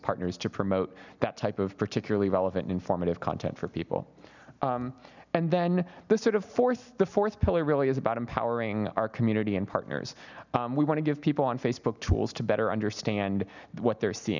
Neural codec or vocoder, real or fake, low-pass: none; real; 7.2 kHz